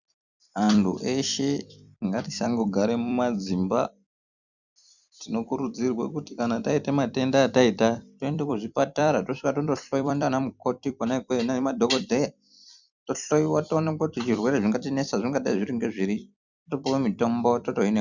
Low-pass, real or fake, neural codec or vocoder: 7.2 kHz; fake; vocoder, 44.1 kHz, 128 mel bands every 256 samples, BigVGAN v2